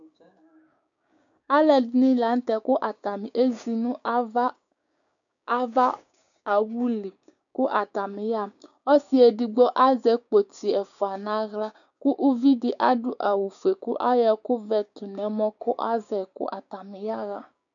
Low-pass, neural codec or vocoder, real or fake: 7.2 kHz; codec, 16 kHz, 6 kbps, DAC; fake